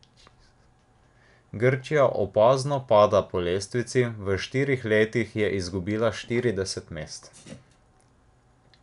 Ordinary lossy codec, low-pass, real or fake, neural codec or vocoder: none; 10.8 kHz; real; none